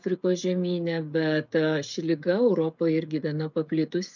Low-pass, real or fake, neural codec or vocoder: 7.2 kHz; fake; codec, 16 kHz, 16 kbps, FreqCodec, smaller model